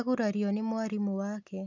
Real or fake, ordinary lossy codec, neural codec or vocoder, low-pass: real; none; none; 7.2 kHz